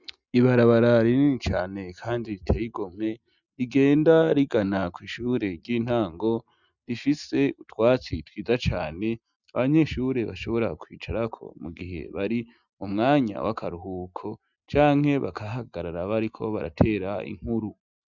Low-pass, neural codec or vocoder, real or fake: 7.2 kHz; none; real